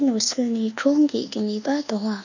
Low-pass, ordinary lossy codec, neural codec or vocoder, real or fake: 7.2 kHz; none; codec, 24 kHz, 1.2 kbps, DualCodec; fake